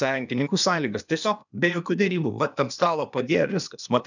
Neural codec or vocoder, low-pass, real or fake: codec, 16 kHz, 0.8 kbps, ZipCodec; 7.2 kHz; fake